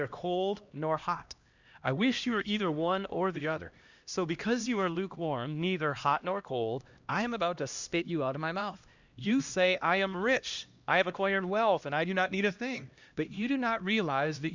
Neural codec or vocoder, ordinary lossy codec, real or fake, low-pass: codec, 16 kHz, 1 kbps, X-Codec, HuBERT features, trained on LibriSpeech; Opus, 64 kbps; fake; 7.2 kHz